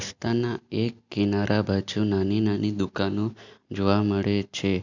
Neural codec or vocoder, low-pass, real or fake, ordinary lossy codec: none; 7.2 kHz; real; none